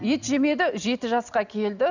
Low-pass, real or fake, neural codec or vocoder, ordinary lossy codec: 7.2 kHz; real; none; none